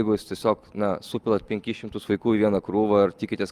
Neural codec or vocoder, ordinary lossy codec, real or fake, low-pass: none; Opus, 32 kbps; real; 14.4 kHz